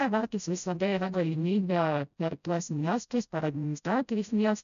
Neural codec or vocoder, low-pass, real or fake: codec, 16 kHz, 0.5 kbps, FreqCodec, smaller model; 7.2 kHz; fake